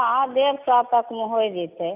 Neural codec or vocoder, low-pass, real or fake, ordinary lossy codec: none; 3.6 kHz; real; none